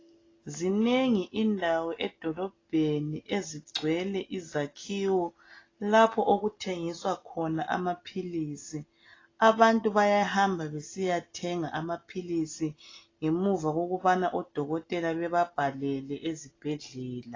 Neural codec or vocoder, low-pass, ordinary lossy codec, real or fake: none; 7.2 kHz; AAC, 32 kbps; real